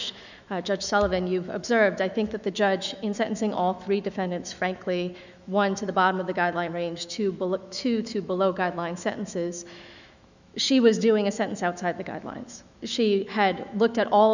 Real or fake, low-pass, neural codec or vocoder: fake; 7.2 kHz; autoencoder, 48 kHz, 128 numbers a frame, DAC-VAE, trained on Japanese speech